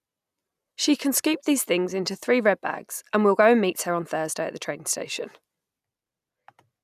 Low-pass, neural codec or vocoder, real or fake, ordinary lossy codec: 14.4 kHz; none; real; none